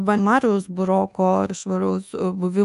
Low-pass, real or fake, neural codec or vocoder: 10.8 kHz; fake; codec, 24 kHz, 1.2 kbps, DualCodec